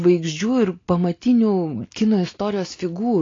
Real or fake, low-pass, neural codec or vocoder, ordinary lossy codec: real; 7.2 kHz; none; AAC, 32 kbps